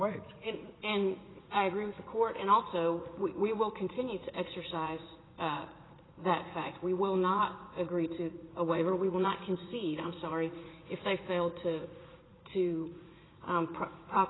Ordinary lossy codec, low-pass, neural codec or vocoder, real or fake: AAC, 16 kbps; 7.2 kHz; vocoder, 44.1 kHz, 80 mel bands, Vocos; fake